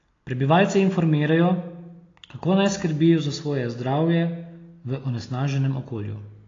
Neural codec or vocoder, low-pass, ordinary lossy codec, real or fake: none; 7.2 kHz; AAC, 32 kbps; real